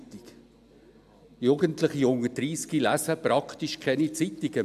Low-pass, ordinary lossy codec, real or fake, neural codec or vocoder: 14.4 kHz; AAC, 96 kbps; real; none